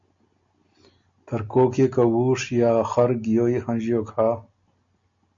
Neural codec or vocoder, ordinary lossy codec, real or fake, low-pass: none; MP3, 48 kbps; real; 7.2 kHz